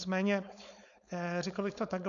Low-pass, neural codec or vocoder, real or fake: 7.2 kHz; codec, 16 kHz, 4.8 kbps, FACodec; fake